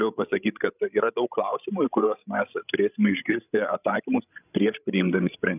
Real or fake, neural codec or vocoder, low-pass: fake; codec, 16 kHz, 16 kbps, FreqCodec, larger model; 3.6 kHz